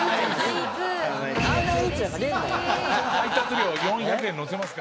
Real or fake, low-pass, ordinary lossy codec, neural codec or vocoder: real; none; none; none